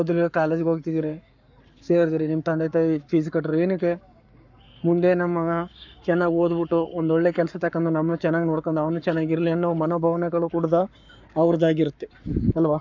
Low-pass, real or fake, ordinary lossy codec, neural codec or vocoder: 7.2 kHz; fake; none; codec, 44.1 kHz, 7.8 kbps, Pupu-Codec